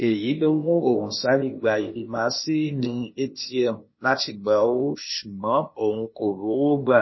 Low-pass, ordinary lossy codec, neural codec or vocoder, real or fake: 7.2 kHz; MP3, 24 kbps; codec, 16 kHz, 0.8 kbps, ZipCodec; fake